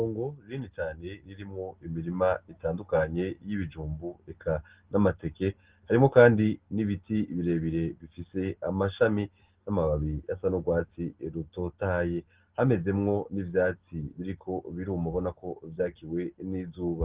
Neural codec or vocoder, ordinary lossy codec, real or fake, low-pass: none; Opus, 16 kbps; real; 3.6 kHz